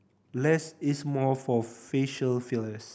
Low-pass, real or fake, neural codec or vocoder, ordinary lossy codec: none; real; none; none